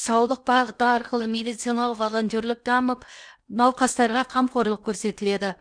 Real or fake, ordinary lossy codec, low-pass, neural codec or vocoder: fake; none; 9.9 kHz; codec, 16 kHz in and 24 kHz out, 0.8 kbps, FocalCodec, streaming, 65536 codes